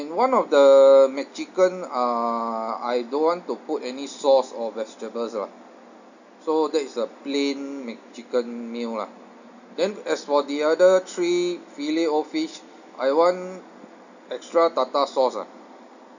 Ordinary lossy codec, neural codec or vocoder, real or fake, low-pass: none; none; real; 7.2 kHz